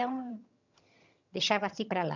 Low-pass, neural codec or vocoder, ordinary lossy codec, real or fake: 7.2 kHz; vocoder, 22.05 kHz, 80 mel bands, HiFi-GAN; none; fake